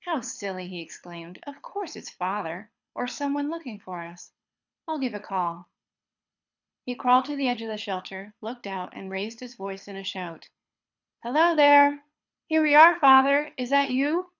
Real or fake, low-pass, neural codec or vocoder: fake; 7.2 kHz; codec, 24 kHz, 6 kbps, HILCodec